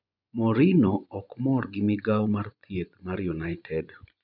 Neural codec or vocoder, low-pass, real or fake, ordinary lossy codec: vocoder, 24 kHz, 100 mel bands, Vocos; 5.4 kHz; fake; none